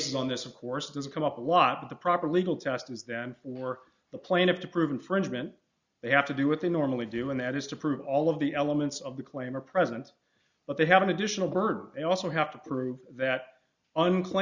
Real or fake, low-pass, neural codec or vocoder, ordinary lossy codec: real; 7.2 kHz; none; Opus, 64 kbps